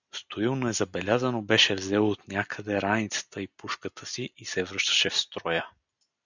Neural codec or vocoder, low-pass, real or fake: none; 7.2 kHz; real